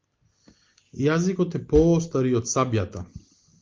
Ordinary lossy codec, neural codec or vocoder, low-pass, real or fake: Opus, 24 kbps; none; 7.2 kHz; real